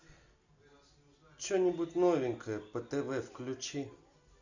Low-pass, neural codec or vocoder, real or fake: 7.2 kHz; none; real